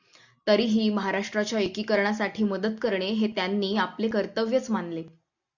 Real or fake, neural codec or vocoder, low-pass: real; none; 7.2 kHz